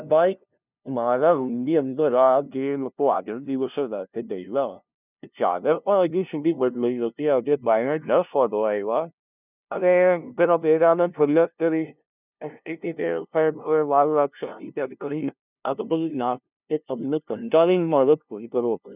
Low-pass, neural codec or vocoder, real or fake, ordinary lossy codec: 3.6 kHz; codec, 16 kHz, 0.5 kbps, FunCodec, trained on LibriTTS, 25 frames a second; fake; none